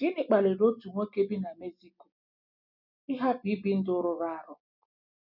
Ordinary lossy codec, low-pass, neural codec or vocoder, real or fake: none; 5.4 kHz; none; real